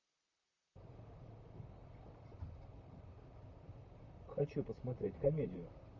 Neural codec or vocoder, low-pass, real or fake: vocoder, 44.1 kHz, 128 mel bands, Pupu-Vocoder; 7.2 kHz; fake